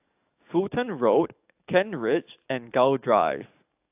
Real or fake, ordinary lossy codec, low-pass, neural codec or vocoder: fake; AAC, 32 kbps; 3.6 kHz; codec, 44.1 kHz, 7.8 kbps, DAC